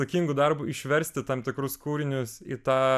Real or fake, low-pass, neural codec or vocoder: real; 14.4 kHz; none